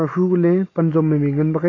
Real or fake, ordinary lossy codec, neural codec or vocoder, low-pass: real; AAC, 32 kbps; none; 7.2 kHz